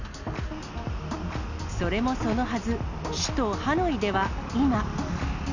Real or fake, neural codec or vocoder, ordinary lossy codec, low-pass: real; none; none; 7.2 kHz